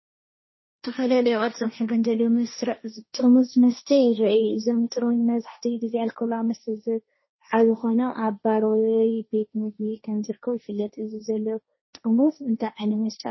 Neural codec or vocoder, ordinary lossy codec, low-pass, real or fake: codec, 16 kHz, 1.1 kbps, Voila-Tokenizer; MP3, 24 kbps; 7.2 kHz; fake